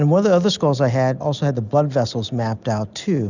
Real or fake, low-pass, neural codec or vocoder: real; 7.2 kHz; none